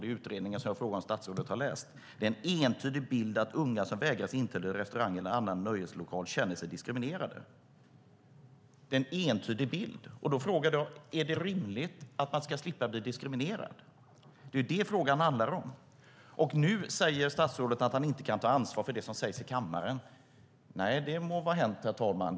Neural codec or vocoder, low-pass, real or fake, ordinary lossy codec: none; none; real; none